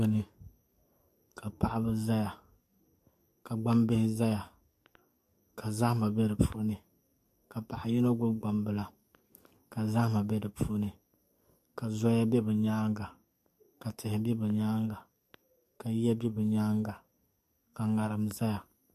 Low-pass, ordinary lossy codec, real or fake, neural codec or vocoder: 14.4 kHz; MP3, 64 kbps; fake; codec, 44.1 kHz, 7.8 kbps, DAC